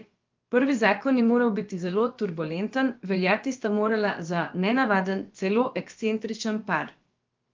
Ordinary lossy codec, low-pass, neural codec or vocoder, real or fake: Opus, 24 kbps; 7.2 kHz; codec, 16 kHz, about 1 kbps, DyCAST, with the encoder's durations; fake